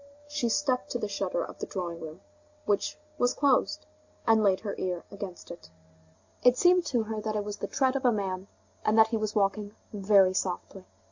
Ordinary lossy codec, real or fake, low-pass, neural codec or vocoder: AAC, 48 kbps; real; 7.2 kHz; none